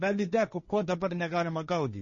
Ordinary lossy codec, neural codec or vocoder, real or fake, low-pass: MP3, 32 kbps; codec, 16 kHz, 1.1 kbps, Voila-Tokenizer; fake; 7.2 kHz